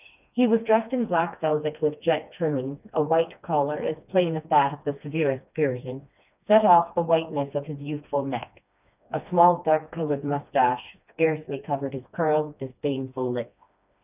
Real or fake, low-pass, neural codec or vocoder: fake; 3.6 kHz; codec, 16 kHz, 2 kbps, FreqCodec, smaller model